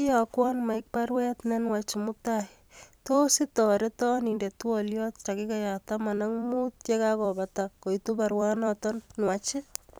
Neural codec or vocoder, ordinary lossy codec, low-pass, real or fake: vocoder, 44.1 kHz, 128 mel bands every 256 samples, BigVGAN v2; none; none; fake